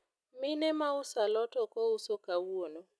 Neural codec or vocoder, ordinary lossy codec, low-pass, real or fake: none; none; none; real